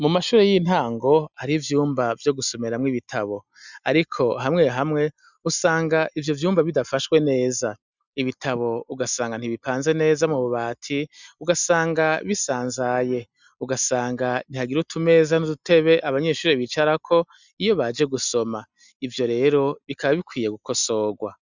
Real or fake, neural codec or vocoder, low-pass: real; none; 7.2 kHz